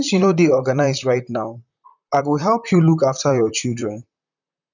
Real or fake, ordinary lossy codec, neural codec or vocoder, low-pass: fake; none; vocoder, 44.1 kHz, 128 mel bands, Pupu-Vocoder; 7.2 kHz